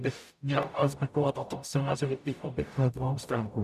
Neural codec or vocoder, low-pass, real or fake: codec, 44.1 kHz, 0.9 kbps, DAC; 14.4 kHz; fake